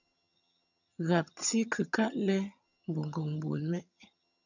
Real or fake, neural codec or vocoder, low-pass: fake; vocoder, 22.05 kHz, 80 mel bands, HiFi-GAN; 7.2 kHz